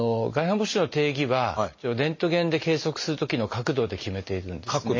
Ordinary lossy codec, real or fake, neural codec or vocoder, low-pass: MP3, 32 kbps; real; none; 7.2 kHz